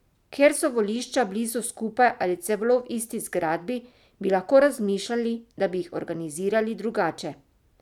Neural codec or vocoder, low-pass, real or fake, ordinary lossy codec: none; 19.8 kHz; real; none